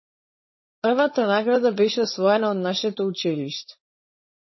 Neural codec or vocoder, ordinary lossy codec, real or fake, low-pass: codec, 16 kHz, 4.8 kbps, FACodec; MP3, 24 kbps; fake; 7.2 kHz